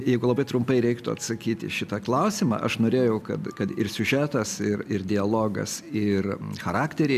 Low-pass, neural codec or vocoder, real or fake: 14.4 kHz; none; real